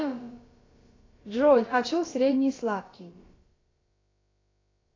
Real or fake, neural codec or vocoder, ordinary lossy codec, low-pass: fake; codec, 16 kHz, about 1 kbps, DyCAST, with the encoder's durations; AAC, 32 kbps; 7.2 kHz